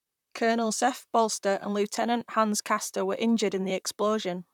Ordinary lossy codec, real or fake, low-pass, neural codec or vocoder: none; fake; 19.8 kHz; vocoder, 44.1 kHz, 128 mel bands, Pupu-Vocoder